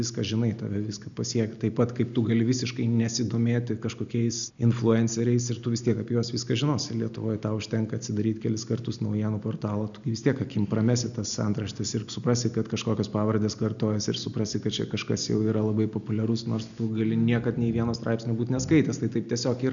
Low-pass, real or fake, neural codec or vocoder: 7.2 kHz; real; none